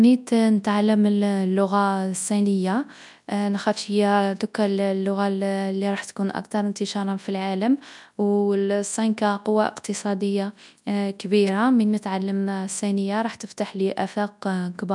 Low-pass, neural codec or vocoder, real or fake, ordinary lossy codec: 10.8 kHz; codec, 24 kHz, 0.9 kbps, WavTokenizer, large speech release; fake; none